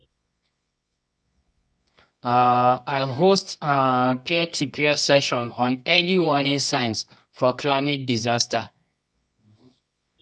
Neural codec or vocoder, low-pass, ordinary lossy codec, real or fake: codec, 24 kHz, 0.9 kbps, WavTokenizer, medium music audio release; 10.8 kHz; none; fake